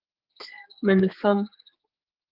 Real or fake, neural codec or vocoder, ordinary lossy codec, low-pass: fake; codec, 16 kHz, 4 kbps, X-Codec, HuBERT features, trained on general audio; Opus, 24 kbps; 5.4 kHz